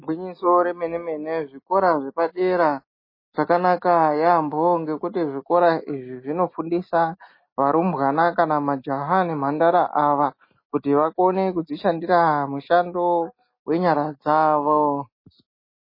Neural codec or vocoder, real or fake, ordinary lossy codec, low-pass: none; real; MP3, 24 kbps; 5.4 kHz